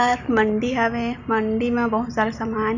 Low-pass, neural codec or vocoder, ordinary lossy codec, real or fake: 7.2 kHz; none; none; real